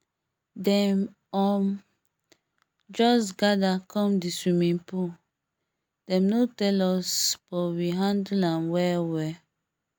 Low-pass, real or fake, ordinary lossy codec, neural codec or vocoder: none; real; none; none